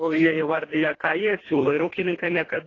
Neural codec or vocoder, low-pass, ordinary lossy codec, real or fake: codec, 24 kHz, 1.5 kbps, HILCodec; 7.2 kHz; AAC, 32 kbps; fake